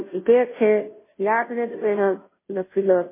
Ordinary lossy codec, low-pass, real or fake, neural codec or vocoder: MP3, 16 kbps; 3.6 kHz; fake; codec, 16 kHz, 0.5 kbps, FunCodec, trained on Chinese and English, 25 frames a second